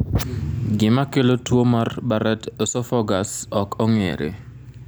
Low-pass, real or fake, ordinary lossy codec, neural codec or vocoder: none; real; none; none